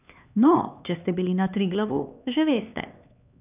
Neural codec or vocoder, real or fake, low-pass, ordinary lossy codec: codec, 16 kHz, 2 kbps, X-Codec, HuBERT features, trained on LibriSpeech; fake; 3.6 kHz; none